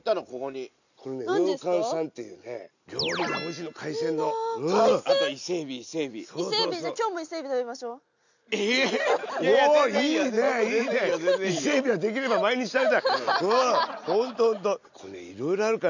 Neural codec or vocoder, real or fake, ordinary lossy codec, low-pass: none; real; none; 7.2 kHz